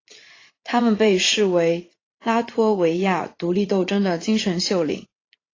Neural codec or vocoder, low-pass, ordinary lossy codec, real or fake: vocoder, 44.1 kHz, 80 mel bands, Vocos; 7.2 kHz; AAC, 32 kbps; fake